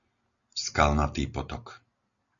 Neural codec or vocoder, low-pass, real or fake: none; 7.2 kHz; real